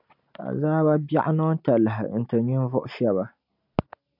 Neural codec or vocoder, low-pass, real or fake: none; 5.4 kHz; real